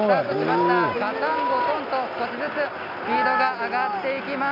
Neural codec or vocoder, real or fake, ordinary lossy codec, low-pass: none; real; none; 5.4 kHz